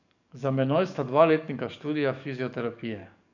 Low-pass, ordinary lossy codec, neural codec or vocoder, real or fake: 7.2 kHz; none; codec, 44.1 kHz, 7.8 kbps, DAC; fake